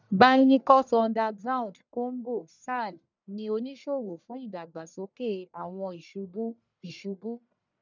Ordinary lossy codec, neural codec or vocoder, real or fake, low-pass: none; codec, 44.1 kHz, 1.7 kbps, Pupu-Codec; fake; 7.2 kHz